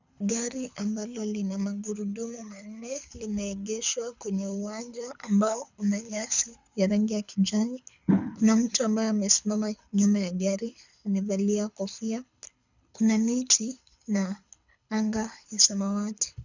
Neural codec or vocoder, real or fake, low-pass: codec, 24 kHz, 6 kbps, HILCodec; fake; 7.2 kHz